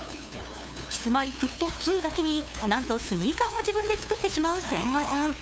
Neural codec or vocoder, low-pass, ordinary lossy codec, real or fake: codec, 16 kHz, 2 kbps, FunCodec, trained on LibriTTS, 25 frames a second; none; none; fake